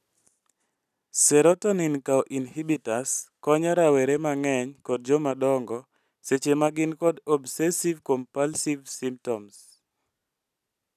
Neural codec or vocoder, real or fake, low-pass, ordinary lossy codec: none; real; 14.4 kHz; none